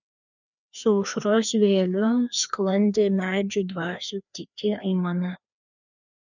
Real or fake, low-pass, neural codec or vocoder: fake; 7.2 kHz; codec, 16 kHz, 2 kbps, FreqCodec, larger model